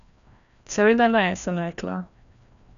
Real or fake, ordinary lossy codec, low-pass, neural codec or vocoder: fake; none; 7.2 kHz; codec, 16 kHz, 1 kbps, FreqCodec, larger model